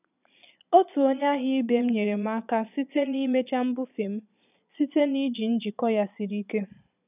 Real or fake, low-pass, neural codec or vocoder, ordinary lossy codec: fake; 3.6 kHz; vocoder, 22.05 kHz, 80 mel bands, Vocos; none